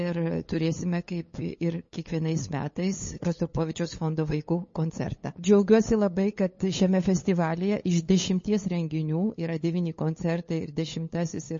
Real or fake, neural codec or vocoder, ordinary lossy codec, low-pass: fake; codec, 16 kHz, 8 kbps, FunCodec, trained on LibriTTS, 25 frames a second; MP3, 32 kbps; 7.2 kHz